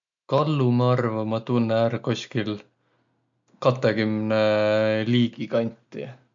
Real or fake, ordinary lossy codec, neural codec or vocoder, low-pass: real; MP3, 64 kbps; none; 7.2 kHz